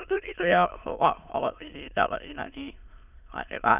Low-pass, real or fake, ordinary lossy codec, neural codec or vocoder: 3.6 kHz; fake; none; autoencoder, 22.05 kHz, a latent of 192 numbers a frame, VITS, trained on many speakers